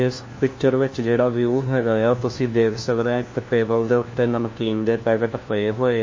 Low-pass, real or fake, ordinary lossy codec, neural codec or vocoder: 7.2 kHz; fake; MP3, 32 kbps; codec, 16 kHz, 1 kbps, FunCodec, trained on LibriTTS, 50 frames a second